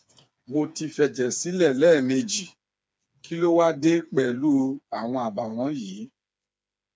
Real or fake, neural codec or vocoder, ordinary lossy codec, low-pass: fake; codec, 16 kHz, 4 kbps, FreqCodec, smaller model; none; none